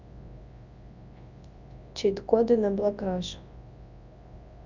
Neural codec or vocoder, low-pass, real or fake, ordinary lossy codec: codec, 24 kHz, 0.9 kbps, WavTokenizer, large speech release; 7.2 kHz; fake; Opus, 64 kbps